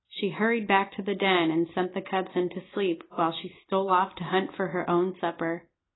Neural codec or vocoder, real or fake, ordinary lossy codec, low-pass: none; real; AAC, 16 kbps; 7.2 kHz